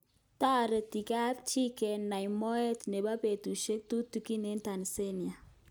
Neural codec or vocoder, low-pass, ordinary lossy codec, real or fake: none; none; none; real